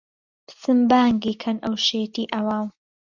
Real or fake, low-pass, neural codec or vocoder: real; 7.2 kHz; none